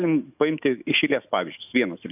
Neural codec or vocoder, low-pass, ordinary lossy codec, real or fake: autoencoder, 48 kHz, 128 numbers a frame, DAC-VAE, trained on Japanese speech; 3.6 kHz; AAC, 32 kbps; fake